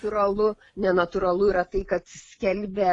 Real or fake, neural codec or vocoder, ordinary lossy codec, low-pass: fake; vocoder, 24 kHz, 100 mel bands, Vocos; AAC, 32 kbps; 10.8 kHz